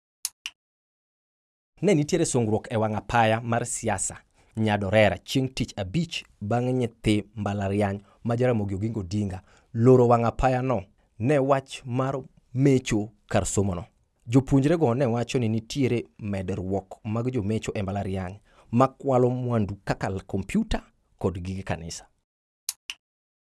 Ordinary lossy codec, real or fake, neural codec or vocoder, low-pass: none; real; none; none